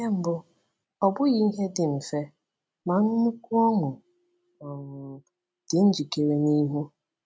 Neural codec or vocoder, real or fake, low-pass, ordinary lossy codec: none; real; none; none